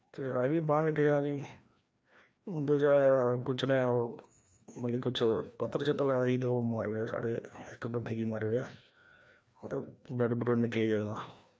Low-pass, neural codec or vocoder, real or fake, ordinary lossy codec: none; codec, 16 kHz, 1 kbps, FreqCodec, larger model; fake; none